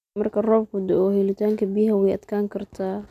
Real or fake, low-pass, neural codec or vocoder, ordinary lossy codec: real; 14.4 kHz; none; none